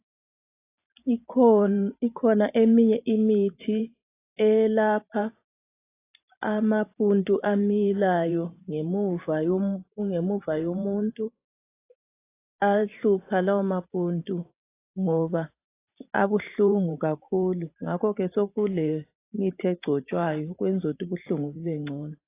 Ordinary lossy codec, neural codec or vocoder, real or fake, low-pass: AAC, 24 kbps; vocoder, 44.1 kHz, 128 mel bands every 512 samples, BigVGAN v2; fake; 3.6 kHz